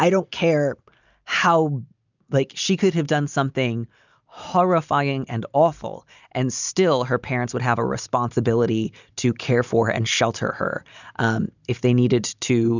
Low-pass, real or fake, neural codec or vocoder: 7.2 kHz; real; none